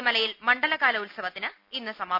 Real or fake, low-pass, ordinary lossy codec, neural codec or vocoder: real; 5.4 kHz; MP3, 48 kbps; none